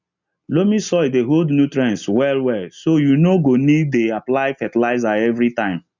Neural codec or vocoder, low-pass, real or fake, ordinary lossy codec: none; 7.2 kHz; real; none